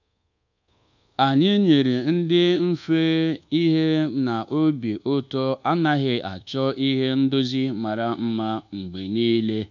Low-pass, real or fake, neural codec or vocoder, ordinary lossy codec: 7.2 kHz; fake; codec, 24 kHz, 1.2 kbps, DualCodec; none